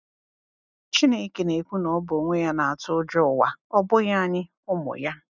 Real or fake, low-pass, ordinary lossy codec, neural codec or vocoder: real; 7.2 kHz; none; none